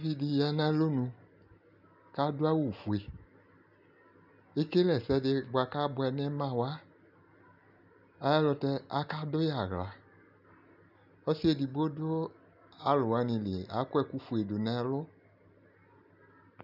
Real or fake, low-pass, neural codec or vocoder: real; 5.4 kHz; none